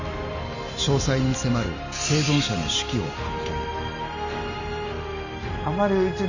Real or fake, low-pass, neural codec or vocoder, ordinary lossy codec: real; 7.2 kHz; none; none